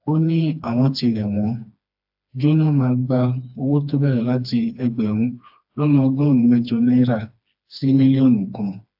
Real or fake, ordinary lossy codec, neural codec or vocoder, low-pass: fake; AAC, 48 kbps; codec, 16 kHz, 2 kbps, FreqCodec, smaller model; 5.4 kHz